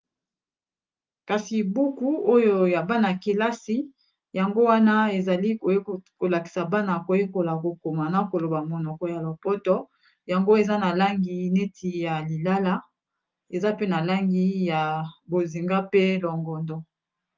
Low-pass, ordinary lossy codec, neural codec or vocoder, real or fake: 7.2 kHz; Opus, 32 kbps; none; real